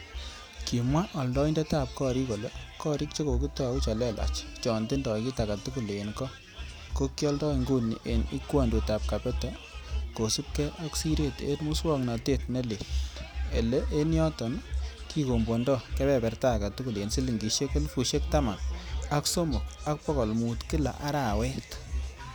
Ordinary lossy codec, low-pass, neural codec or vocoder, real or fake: none; none; none; real